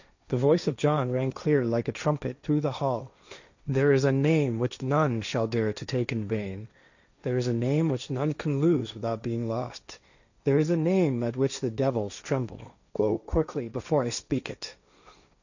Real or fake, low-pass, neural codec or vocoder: fake; 7.2 kHz; codec, 16 kHz, 1.1 kbps, Voila-Tokenizer